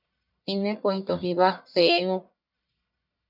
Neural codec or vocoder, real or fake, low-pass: codec, 44.1 kHz, 1.7 kbps, Pupu-Codec; fake; 5.4 kHz